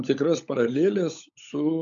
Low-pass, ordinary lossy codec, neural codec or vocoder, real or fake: 7.2 kHz; MP3, 96 kbps; codec, 16 kHz, 16 kbps, FunCodec, trained on LibriTTS, 50 frames a second; fake